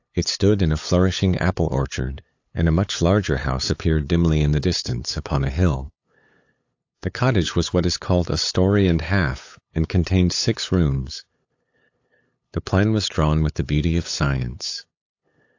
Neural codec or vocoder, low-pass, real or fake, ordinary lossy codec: codec, 16 kHz, 8 kbps, FunCodec, trained on LibriTTS, 25 frames a second; 7.2 kHz; fake; AAC, 48 kbps